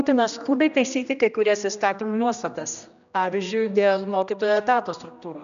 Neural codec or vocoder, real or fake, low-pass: codec, 16 kHz, 1 kbps, X-Codec, HuBERT features, trained on general audio; fake; 7.2 kHz